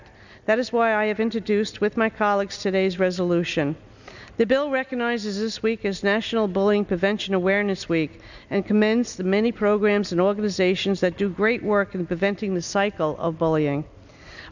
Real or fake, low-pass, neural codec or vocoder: real; 7.2 kHz; none